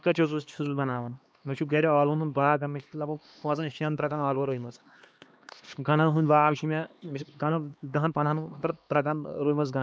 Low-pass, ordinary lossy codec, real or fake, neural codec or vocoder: none; none; fake; codec, 16 kHz, 2 kbps, X-Codec, HuBERT features, trained on LibriSpeech